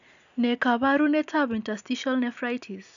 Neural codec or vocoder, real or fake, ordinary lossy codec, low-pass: none; real; none; 7.2 kHz